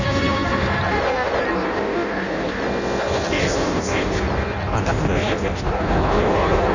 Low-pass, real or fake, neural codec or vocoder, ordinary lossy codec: 7.2 kHz; fake; codec, 16 kHz in and 24 kHz out, 0.6 kbps, FireRedTTS-2 codec; none